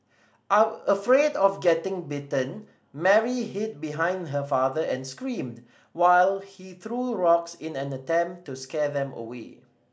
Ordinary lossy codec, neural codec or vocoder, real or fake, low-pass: none; none; real; none